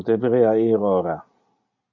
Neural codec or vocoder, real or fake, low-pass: none; real; 7.2 kHz